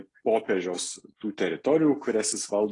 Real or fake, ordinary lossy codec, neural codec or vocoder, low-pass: real; AAC, 32 kbps; none; 10.8 kHz